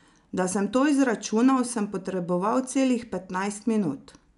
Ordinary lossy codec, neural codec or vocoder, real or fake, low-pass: none; none; real; 10.8 kHz